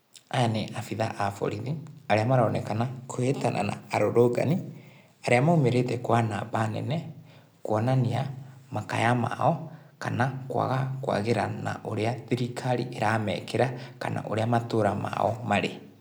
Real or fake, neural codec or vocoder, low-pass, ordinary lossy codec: real; none; none; none